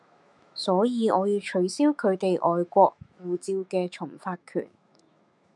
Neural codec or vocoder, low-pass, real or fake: autoencoder, 48 kHz, 128 numbers a frame, DAC-VAE, trained on Japanese speech; 10.8 kHz; fake